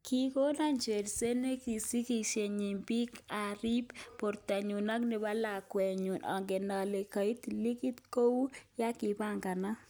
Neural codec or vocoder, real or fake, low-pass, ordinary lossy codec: none; real; none; none